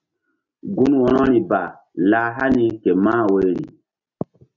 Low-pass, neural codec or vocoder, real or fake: 7.2 kHz; none; real